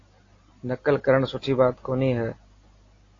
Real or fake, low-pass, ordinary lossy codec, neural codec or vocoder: real; 7.2 kHz; AAC, 32 kbps; none